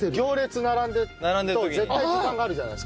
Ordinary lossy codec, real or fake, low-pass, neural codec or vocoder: none; real; none; none